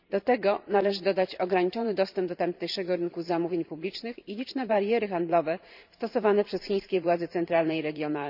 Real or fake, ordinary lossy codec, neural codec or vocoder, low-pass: fake; none; vocoder, 44.1 kHz, 128 mel bands every 512 samples, BigVGAN v2; 5.4 kHz